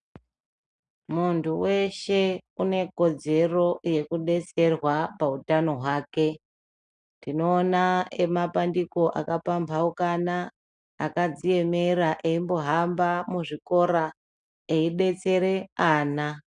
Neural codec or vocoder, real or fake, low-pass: none; real; 9.9 kHz